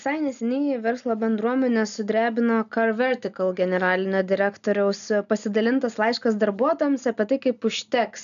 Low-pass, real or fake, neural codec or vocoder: 7.2 kHz; real; none